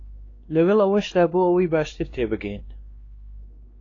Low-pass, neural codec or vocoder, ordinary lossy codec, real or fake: 7.2 kHz; codec, 16 kHz, 2 kbps, X-Codec, WavLM features, trained on Multilingual LibriSpeech; AAC, 32 kbps; fake